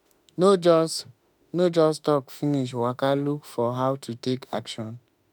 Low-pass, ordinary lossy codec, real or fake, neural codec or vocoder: none; none; fake; autoencoder, 48 kHz, 32 numbers a frame, DAC-VAE, trained on Japanese speech